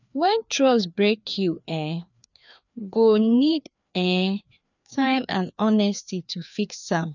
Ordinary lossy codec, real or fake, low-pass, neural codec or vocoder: none; fake; 7.2 kHz; codec, 16 kHz, 2 kbps, FreqCodec, larger model